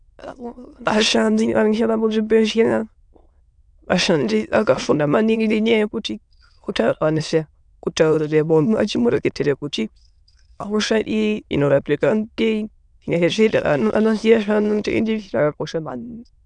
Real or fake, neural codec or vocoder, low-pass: fake; autoencoder, 22.05 kHz, a latent of 192 numbers a frame, VITS, trained on many speakers; 9.9 kHz